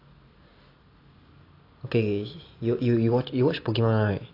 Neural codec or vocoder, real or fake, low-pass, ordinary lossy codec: none; real; 5.4 kHz; AAC, 32 kbps